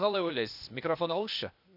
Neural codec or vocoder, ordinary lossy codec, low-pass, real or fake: codec, 16 kHz, 0.8 kbps, ZipCodec; none; 5.4 kHz; fake